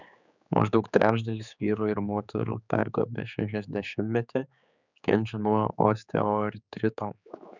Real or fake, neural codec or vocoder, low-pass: fake; codec, 16 kHz, 4 kbps, X-Codec, HuBERT features, trained on general audio; 7.2 kHz